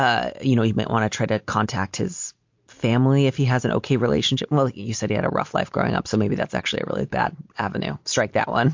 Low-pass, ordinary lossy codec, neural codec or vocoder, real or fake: 7.2 kHz; MP3, 48 kbps; none; real